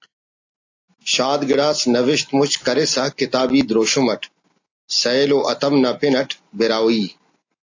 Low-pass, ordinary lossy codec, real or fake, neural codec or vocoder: 7.2 kHz; AAC, 48 kbps; real; none